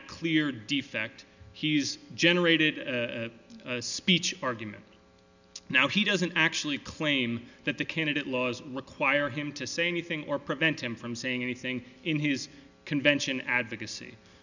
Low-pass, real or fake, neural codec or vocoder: 7.2 kHz; real; none